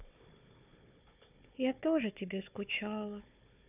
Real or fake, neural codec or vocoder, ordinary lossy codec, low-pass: fake; codec, 16 kHz, 4 kbps, FunCodec, trained on Chinese and English, 50 frames a second; none; 3.6 kHz